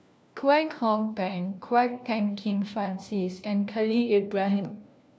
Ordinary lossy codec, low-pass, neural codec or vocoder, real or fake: none; none; codec, 16 kHz, 1 kbps, FunCodec, trained on LibriTTS, 50 frames a second; fake